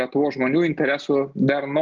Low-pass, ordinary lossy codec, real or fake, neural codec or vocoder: 7.2 kHz; Opus, 32 kbps; real; none